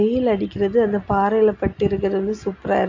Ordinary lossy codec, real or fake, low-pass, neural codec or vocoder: AAC, 48 kbps; real; 7.2 kHz; none